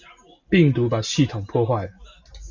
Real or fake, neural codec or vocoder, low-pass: real; none; 7.2 kHz